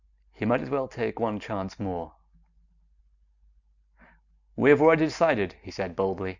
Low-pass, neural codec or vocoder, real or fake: 7.2 kHz; none; real